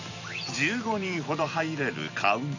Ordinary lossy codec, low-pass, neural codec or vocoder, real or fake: none; 7.2 kHz; vocoder, 44.1 kHz, 128 mel bands every 256 samples, BigVGAN v2; fake